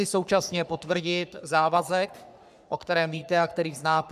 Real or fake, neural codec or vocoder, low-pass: fake; codec, 44.1 kHz, 3.4 kbps, Pupu-Codec; 14.4 kHz